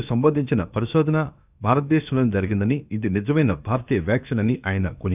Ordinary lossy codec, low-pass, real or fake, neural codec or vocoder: none; 3.6 kHz; fake; codec, 16 kHz, about 1 kbps, DyCAST, with the encoder's durations